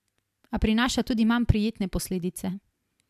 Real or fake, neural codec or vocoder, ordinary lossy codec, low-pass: real; none; AAC, 96 kbps; 14.4 kHz